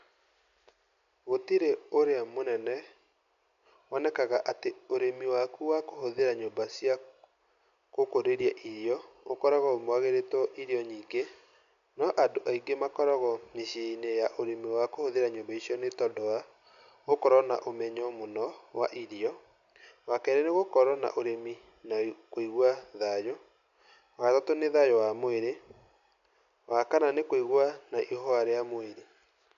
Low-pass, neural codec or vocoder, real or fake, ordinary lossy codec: 7.2 kHz; none; real; AAC, 96 kbps